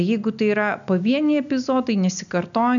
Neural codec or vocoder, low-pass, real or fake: none; 7.2 kHz; real